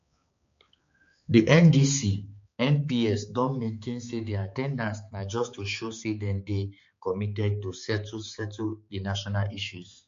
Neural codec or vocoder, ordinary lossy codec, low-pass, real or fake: codec, 16 kHz, 4 kbps, X-Codec, HuBERT features, trained on balanced general audio; MP3, 48 kbps; 7.2 kHz; fake